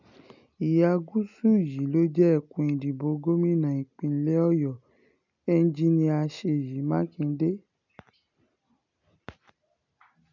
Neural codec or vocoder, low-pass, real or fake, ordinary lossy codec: none; 7.2 kHz; real; none